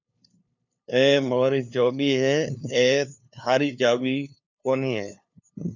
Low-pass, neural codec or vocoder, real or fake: 7.2 kHz; codec, 16 kHz, 2 kbps, FunCodec, trained on LibriTTS, 25 frames a second; fake